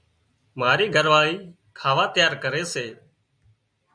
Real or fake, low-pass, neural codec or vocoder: real; 9.9 kHz; none